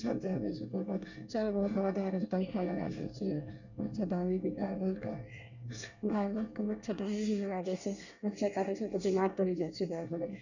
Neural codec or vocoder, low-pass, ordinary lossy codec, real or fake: codec, 24 kHz, 1 kbps, SNAC; 7.2 kHz; none; fake